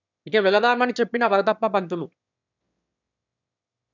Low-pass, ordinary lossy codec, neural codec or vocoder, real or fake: 7.2 kHz; none; autoencoder, 22.05 kHz, a latent of 192 numbers a frame, VITS, trained on one speaker; fake